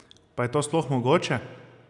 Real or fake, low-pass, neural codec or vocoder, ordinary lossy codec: real; 10.8 kHz; none; none